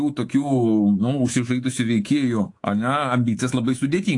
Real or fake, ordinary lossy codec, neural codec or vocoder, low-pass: fake; AAC, 48 kbps; codec, 24 kHz, 3.1 kbps, DualCodec; 10.8 kHz